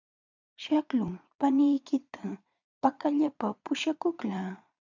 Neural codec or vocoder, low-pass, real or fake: vocoder, 22.05 kHz, 80 mel bands, Vocos; 7.2 kHz; fake